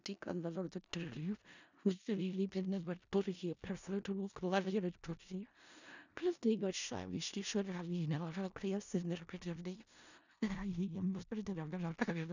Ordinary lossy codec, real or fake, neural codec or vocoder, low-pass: none; fake; codec, 16 kHz in and 24 kHz out, 0.4 kbps, LongCat-Audio-Codec, four codebook decoder; 7.2 kHz